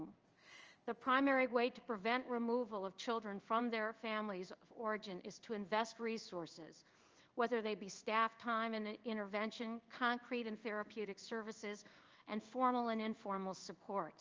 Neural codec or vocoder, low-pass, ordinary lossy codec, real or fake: codec, 16 kHz in and 24 kHz out, 1 kbps, XY-Tokenizer; 7.2 kHz; Opus, 24 kbps; fake